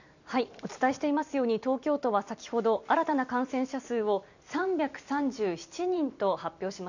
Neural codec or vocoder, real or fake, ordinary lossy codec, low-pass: vocoder, 44.1 kHz, 128 mel bands every 256 samples, BigVGAN v2; fake; none; 7.2 kHz